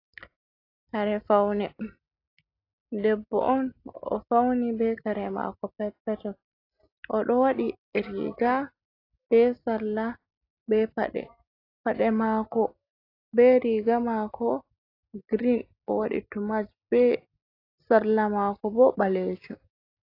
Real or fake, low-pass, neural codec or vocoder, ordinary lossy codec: real; 5.4 kHz; none; AAC, 32 kbps